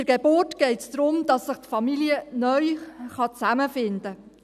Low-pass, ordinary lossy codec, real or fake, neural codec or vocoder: 14.4 kHz; none; real; none